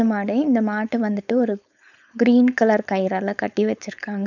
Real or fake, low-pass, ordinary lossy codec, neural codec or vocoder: fake; 7.2 kHz; none; codec, 16 kHz, 4.8 kbps, FACodec